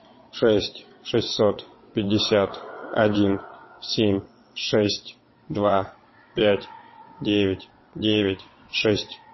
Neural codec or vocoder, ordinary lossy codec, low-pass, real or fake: vocoder, 22.05 kHz, 80 mel bands, WaveNeXt; MP3, 24 kbps; 7.2 kHz; fake